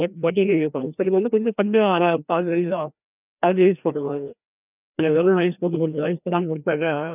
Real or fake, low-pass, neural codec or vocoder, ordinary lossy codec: fake; 3.6 kHz; codec, 16 kHz, 1 kbps, FreqCodec, larger model; none